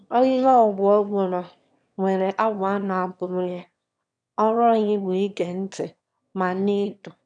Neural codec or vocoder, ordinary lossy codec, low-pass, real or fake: autoencoder, 22.05 kHz, a latent of 192 numbers a frame, VITS, trained on one speaker; none; 9.9 kHz; fake